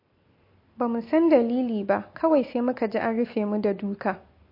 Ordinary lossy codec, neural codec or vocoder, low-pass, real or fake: MP3, 32 kbps; none; 5.4 kHz; real